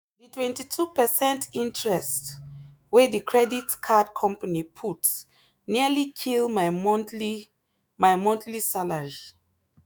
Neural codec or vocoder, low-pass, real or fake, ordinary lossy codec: autoencoder, 48 kHz, 128 numbers a frame, DAC-VAE, trained on Japanese speech; none; fake; none